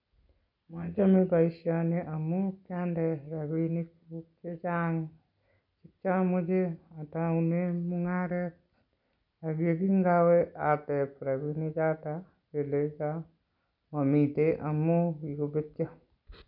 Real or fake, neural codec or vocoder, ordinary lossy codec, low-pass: real; none; none; 5.4 kHz